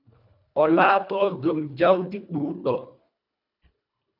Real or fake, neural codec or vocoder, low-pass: fake; codec, 24 kHz, 1.5 kbps, HILCodec; 5.4 kHz